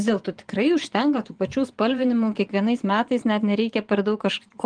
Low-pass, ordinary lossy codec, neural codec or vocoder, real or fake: 9.9 kHz; Opus, 24 kbps; vocoder, 24 kHz, 100 mel bands, Vocos; fake